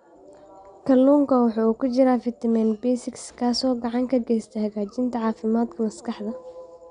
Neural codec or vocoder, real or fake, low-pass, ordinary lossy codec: none; real; 9.9 kHz; MP3, 96 kbps